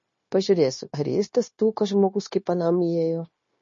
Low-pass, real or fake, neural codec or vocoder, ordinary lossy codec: 7.2 kHz; fake; codec, 16 kHz, 0.9 kbps, LongCat-Audio-Codec; MP3, 32 kbps